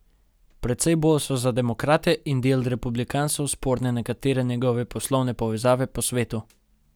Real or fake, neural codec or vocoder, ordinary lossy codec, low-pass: real; none; none; none